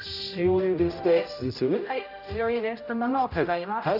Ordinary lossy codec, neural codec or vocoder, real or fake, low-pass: none; codec, 16 kHz, 0.5 kbps, X-Codec, HuBERT features, trained on general audio; fake; 5.4 kHz